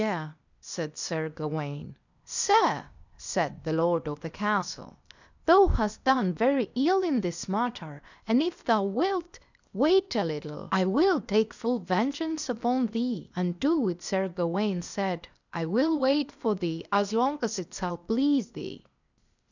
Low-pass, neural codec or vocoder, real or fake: 7.2 kHz; codec, 16 kHz, 0.8 kbps, ZipCodec; fake